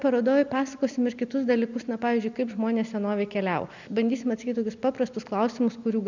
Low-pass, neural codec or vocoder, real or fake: 7.2 kHz; none; real